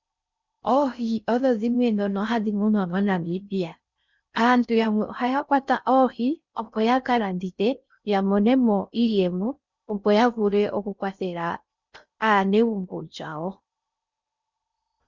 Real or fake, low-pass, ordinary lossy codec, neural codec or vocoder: fake; 7.2 kHz; Opus, 64 kbps; codec, 16 kHz in and 24 kHz out, 0.6 kbps, FocalCodec, streaming, 4096 codes